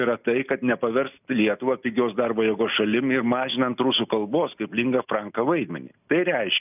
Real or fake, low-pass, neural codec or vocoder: real; 3.6 kHz; none